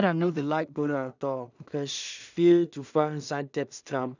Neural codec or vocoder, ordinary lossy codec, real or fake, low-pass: codec, 16 kHz in and 24 kHz out, 0.4 kbps, LongCat-Audio-Codec, two codebook decoder; none; fake; 7.2 kHz